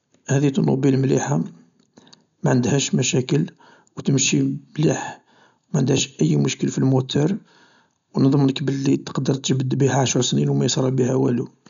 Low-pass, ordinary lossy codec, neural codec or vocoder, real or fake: 7.2 kHz; none; none; real